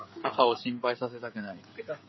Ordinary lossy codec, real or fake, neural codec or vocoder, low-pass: MP3, 24 kbps; fake; codec, 16 kHz, 16 kbps, FreqCodec, smaller model; 7.2 kHz